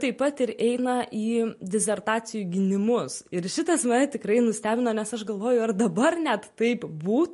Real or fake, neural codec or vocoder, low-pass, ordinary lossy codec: real; none; 14.4 kHz; MP3, 48 kbps